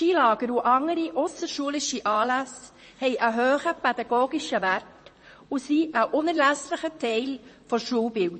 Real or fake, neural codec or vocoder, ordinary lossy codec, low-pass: fake; vocoder, 44.1 kHz, 128 mel bands, Pupu-Vocoder; MP3, 32 kbps; 10.8 kHz